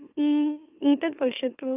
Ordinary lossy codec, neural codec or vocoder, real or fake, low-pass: AAC, 24 kbps; autoencoder, 44.1 kHz, a latent of 192 numbers a frame, MeloTTS; fake; 3.6 kHz